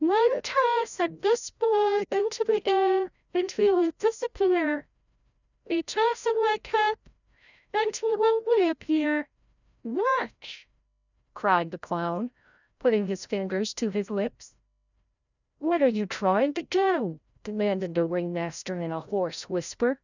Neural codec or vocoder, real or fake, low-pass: codec, 16 kHz, 0.5 kbps, FreqCodec, larger model; fake; 7.2 kHz